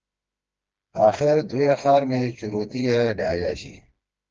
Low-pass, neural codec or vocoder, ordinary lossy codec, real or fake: 7.2 kHz; codec, 16 kHz, 2 kbps, FreqCodec, smaller model; Opus, 24 kbps; fake